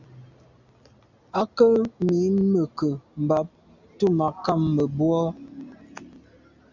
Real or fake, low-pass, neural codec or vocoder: real; 7.2 kHz; none